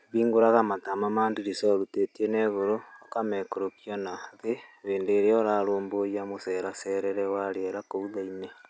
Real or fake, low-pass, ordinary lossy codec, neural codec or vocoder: real; none; none; none